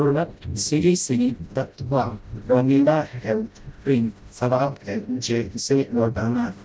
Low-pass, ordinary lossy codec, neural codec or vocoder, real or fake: none; none; codec, 16 kHz, 0.5 kbps, FreqCodec, smaller model; fake